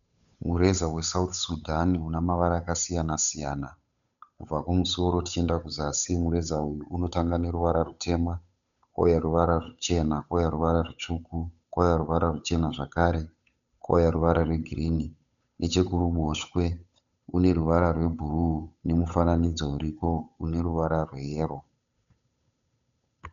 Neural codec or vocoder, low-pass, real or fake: codec, 16 kHz, 8 kbps, FunCodec, trained on Chinese and English, 25 frames a second; 7.2 kHz; fake